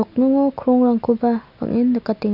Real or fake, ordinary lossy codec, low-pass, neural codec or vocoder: real; none; 5.4 kHz; none